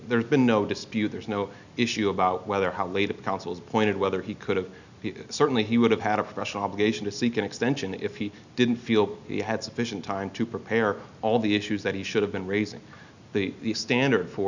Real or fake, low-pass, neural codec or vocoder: real; 7.2 kHz; none